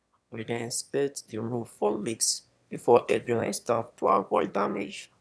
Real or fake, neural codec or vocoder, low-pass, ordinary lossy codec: fake; autoencoder, 22.05 kHz, a latent of 192 numbers a frame, VITS, trained on one speaker; none; none